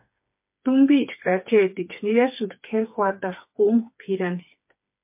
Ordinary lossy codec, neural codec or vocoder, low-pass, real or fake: MP3, 32 kbps; codec, 16 kHz, 4 kbps, FreqCodec, smaller model; 3.6 kHz; fake